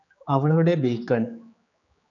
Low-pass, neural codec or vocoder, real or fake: 7.2 kHz; codec, 16 kHz, 4 kbps, X-Codec, HuBERT features, trained on general audio; fake